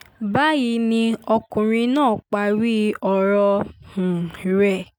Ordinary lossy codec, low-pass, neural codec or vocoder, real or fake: none; 19.8 kHz; none; real